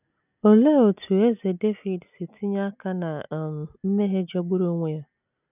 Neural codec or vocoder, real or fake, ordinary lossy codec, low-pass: none; real; none; 3.6 kHz